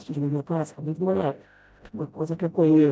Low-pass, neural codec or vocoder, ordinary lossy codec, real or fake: none; codec, 16 kHz, 0.5 kbps, FreqCodec, smaller model; none; fake